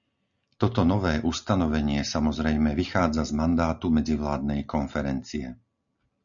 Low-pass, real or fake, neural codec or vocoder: 7.2 kHz; real; none